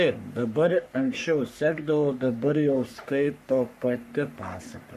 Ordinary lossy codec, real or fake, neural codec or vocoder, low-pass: MP3, 64 kbps; fake; codec, 44.1 kHz, 3.4 kbps, Pupu-Codec; 14.4 kHz